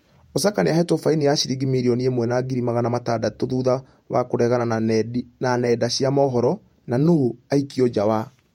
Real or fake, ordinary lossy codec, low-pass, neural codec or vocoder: fake; MP3, 64 kbps; 19.8 kHz; vocoder, 48 kHz, 128 mel bands, Vocos